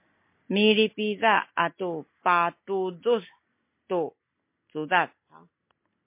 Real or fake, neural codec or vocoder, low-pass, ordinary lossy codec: real; none; 3.6 kHz; MP3, 24 kbps